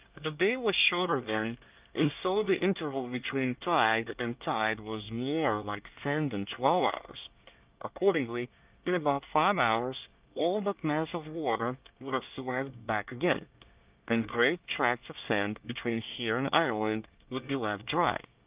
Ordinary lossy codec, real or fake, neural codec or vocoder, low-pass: Opus, 24 kbps; fake; codec, 24 kHz, 1 kbps, SNAC; 3.6 kHz